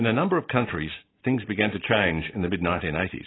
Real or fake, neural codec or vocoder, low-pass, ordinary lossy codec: real; none; 7.2 kHz; AAC, 16 kbps